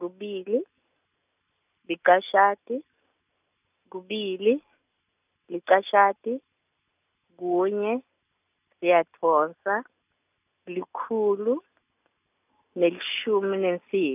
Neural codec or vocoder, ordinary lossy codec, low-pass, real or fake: none; none; 3.6 kHz; real